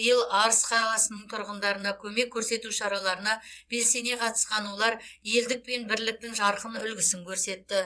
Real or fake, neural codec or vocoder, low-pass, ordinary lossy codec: fake; vocoder, 22.05 kHz, 80 mel bands, WaveNeXt; none; none